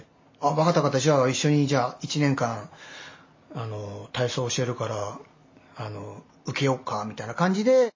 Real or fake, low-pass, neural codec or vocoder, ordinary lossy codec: real; 7.2 kHz; none; MP3, 32 kbps